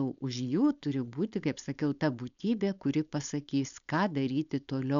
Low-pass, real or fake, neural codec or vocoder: 7.2 kHz; fake; codec, 16 kHz, 4.8 kbps, FACodec